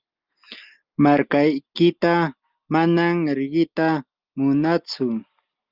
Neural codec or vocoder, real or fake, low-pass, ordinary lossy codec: none; real; 5.4 kHz; Opus, 24 kbps